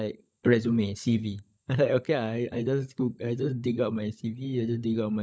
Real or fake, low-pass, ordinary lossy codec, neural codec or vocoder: fake; none; none; codec, 16 kHz, 16 kbps, FunCodec, trained on LibriTTS, 50 frames a second